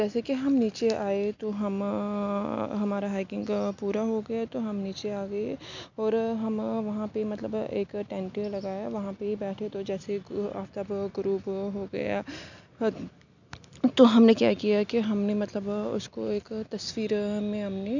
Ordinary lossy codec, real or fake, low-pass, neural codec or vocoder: none; real; 7.2 kHz; none